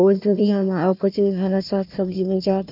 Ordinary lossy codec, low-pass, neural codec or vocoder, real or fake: none; 5.4 kHz; codec, 16 kHz, 1 kbps, FunCodec, trained on Chinese and English, 50 frames a second; fake